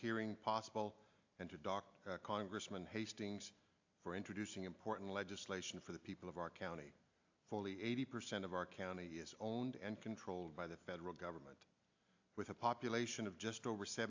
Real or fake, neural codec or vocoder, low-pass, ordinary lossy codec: real; none; 7.2 kHz; AAC, 48 kbps